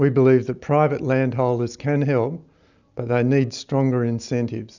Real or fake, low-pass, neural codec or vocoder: real; 7.2 kHz; none